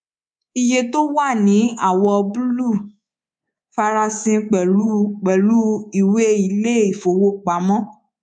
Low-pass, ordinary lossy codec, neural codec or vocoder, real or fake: 9.9 kHz; MP3, 96 kbps; codec, 24 kHz, 3.1 kbps, DualCodec; fake